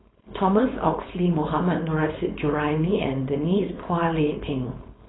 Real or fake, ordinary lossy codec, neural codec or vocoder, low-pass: fake; AAC, 16 kbps; codec, 16 kHz, 4.8 kbps, FACodec; 7.2 kHz